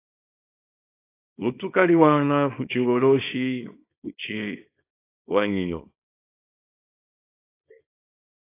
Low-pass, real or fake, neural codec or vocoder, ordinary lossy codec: 3.6 kHz; fake; codec, 24 kHz, 0.9 kbps, WavTokenizer, small release; AAC, 24 kbps